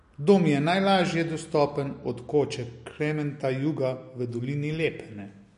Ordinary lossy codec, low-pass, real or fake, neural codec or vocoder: MP3, 48 kbps; 10.8 kHz; real; none